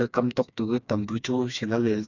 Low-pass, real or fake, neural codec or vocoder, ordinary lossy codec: 7.2 kHz; fake; codec, 16 kHz, 2 kbps, FreqCodec, smaller model; none